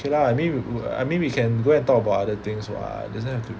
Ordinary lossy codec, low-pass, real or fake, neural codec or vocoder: none; none; real; none